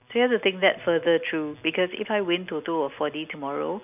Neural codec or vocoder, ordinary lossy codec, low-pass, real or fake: none; none; 3.6 kHz; real